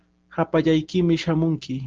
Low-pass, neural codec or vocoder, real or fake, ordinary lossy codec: 7.2 kHz; none; real; Opus, 16 kbps